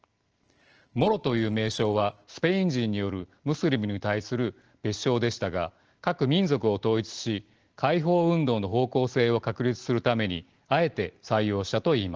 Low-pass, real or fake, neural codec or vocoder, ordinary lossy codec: 7.2 kHz; real; none; Opus, 16 kbps